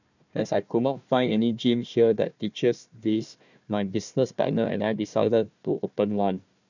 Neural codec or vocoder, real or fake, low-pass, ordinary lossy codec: codec, 16 kHz, 1 kbps, FunCodec, trained on Chinese and English, 50 frames a second; fake; 7.2 kHz; none